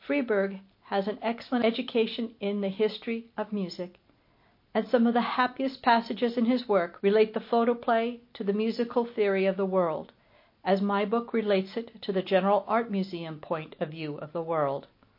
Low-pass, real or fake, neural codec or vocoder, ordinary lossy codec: 5.4 kHz; real; none; MP3, 32 kbps